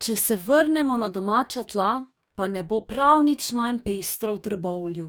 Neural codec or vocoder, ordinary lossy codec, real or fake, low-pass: codec, 44.1 kHz, 2.6 kbps, DAC; none; fake; none